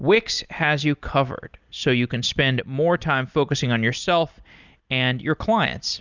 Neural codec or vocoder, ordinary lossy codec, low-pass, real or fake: none; Opus, 64 kbps; 7.2 kHz; real